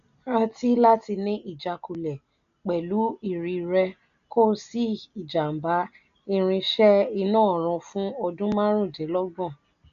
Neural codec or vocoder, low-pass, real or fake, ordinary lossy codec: none; 7.2 kHz; real; none